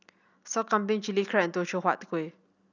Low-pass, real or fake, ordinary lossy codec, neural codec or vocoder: 7.2 kHz; real; none; none